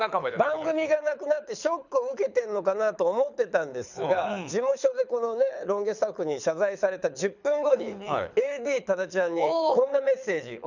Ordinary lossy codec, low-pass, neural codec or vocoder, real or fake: none; 7.2 kHz; codec, 24 kHz, 6 kbps, HILCodec; fake